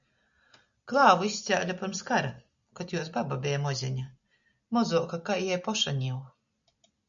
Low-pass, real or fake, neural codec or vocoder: 7.2 kHz; real; none